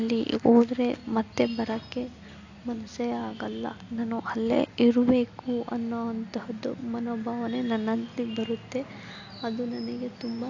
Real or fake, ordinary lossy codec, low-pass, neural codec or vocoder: real; AAC, 48 kbps; 7.2 kHz; none